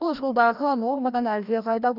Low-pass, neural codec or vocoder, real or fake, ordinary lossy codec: 5.4 kHz; codec, 16 kHz, 1 kbps, FreqCodec, larger model; fake; none